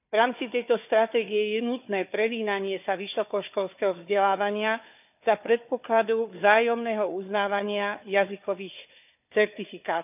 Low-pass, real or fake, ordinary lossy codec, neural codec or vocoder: 3.6 kHz; fake; none; codec, 16 kHz, 4 kbps, FunCodec, trained on Chinese and English, 50 frames a second